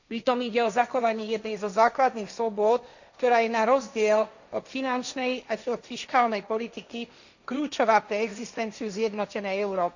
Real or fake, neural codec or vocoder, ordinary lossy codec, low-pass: fake; codec, 16 kHz, 1.1 kbps, Voila-Tokenizer; none; 7.2 kHz